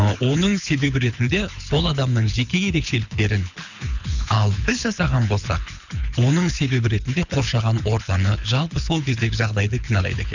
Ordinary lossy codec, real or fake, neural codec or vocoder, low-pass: none; fake; codec, 24 kHz, 6 kbps, HILCodec; 7.2 kHz